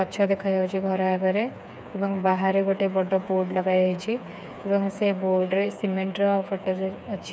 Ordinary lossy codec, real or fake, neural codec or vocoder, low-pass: none; fake; codec, 16 kHz, 4 kbps, FreqCodec, smaller model; none